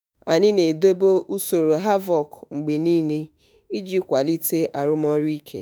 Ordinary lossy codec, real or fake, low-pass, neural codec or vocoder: none; fake; none; autoencoder, 48 kHz, 32 numbers a frame, DAC-VAE, trained on Japanese speech